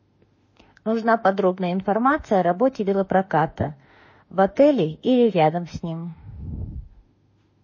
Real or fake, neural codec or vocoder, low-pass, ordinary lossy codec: fake; autoencoder, 48 kHz, 32 numbers a frame, DAC-VAE, trained on Japanese speech; 7.2 kHz; MP3, 32 kbps